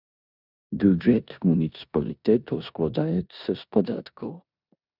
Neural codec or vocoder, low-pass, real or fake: codec, 16 kHz in and 24 kHz out, 0.9 kbps, LongCat-Audio-Codec, four codebook decoder; 5.4 kHz; fake